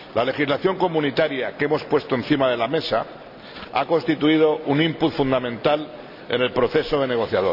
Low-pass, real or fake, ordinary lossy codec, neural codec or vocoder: 5.4 kHz; real; none; none